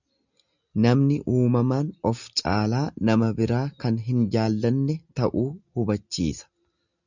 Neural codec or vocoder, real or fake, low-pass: none; real; 7.2 kHz